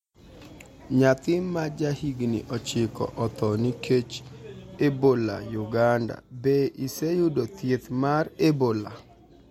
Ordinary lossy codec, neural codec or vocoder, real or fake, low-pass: MP3, 64 kbps; none; real; 19.8 kHz